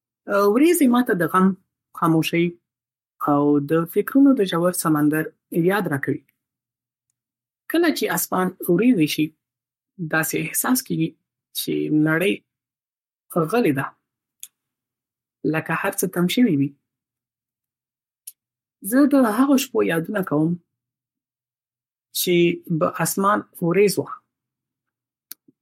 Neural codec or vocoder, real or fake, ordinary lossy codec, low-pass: codec, 44.1 kHz, 7.8 kbps, Pupu-Codec; fake; MP3, 64 kbps; 19.8 kHz